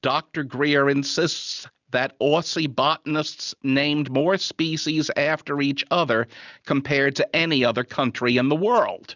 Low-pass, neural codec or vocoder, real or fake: 7.2 kHz; none; real